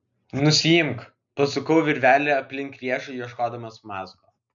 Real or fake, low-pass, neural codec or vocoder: real; 7.2 kHz; none